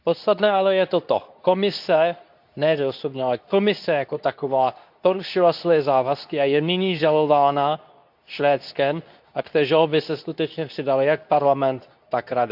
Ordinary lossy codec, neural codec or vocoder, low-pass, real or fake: none; codec, 24 kHz, 0.9 kbps, WavTokenizer, medium speech release version 2; 5.4 kHz; fake